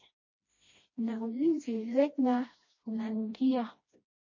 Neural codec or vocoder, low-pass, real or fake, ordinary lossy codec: codec, 16 kHz, 1 kbps, FreqCodec, smaller model; 7.2 kHz; fake; MP3, 32 kbps